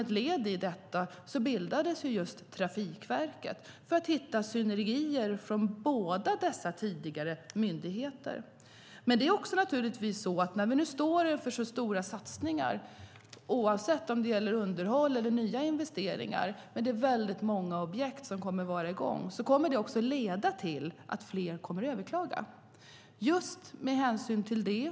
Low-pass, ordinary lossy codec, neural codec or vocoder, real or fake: none; none; none; real